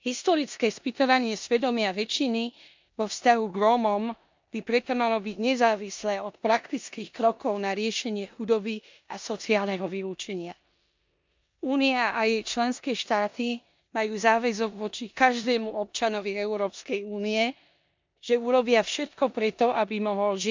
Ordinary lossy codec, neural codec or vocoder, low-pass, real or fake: MP3, 64 kbps; codec, 16 kHz in and 24 kHz out, 0.9 kbps, LongCat-Audio-Codec, four codebook decoder; 7.2 kHz; fake